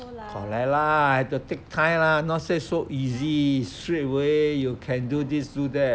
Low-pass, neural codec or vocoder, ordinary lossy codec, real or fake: none; none; none; real